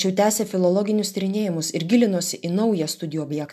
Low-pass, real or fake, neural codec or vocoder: 14.4 kHz; real; none